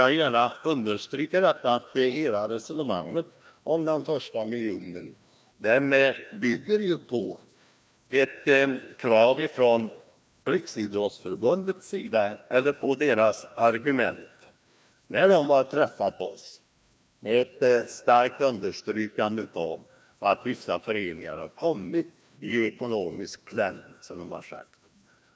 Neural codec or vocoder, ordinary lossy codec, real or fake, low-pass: codec, 16 kHz, 1 kbps, FreqCodec, larger model; none; fake; none